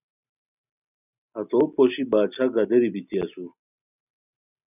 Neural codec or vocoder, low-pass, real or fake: none; 3.6 kHz; real